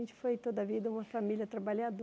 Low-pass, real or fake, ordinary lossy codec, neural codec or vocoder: none; real; none; none